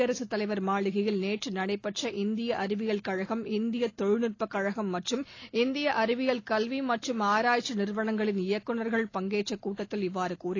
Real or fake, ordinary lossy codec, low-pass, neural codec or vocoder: real; AAC, 32 kbps; 7.2 kHz; none